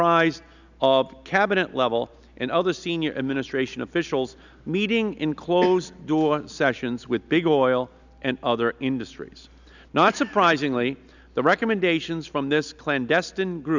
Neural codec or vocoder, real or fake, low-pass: none; real; 7.2 kHz